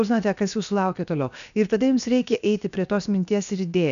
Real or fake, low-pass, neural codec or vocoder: fake; 7.2 kHz; codec, 16 kHz, 0.7 kbps, FocalCodec